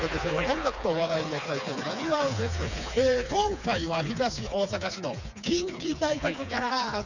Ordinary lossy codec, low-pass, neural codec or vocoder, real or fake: none; 7.2 kHz; codec, 16 kHz, 4 kbps, FreqCodec, smaller model; fake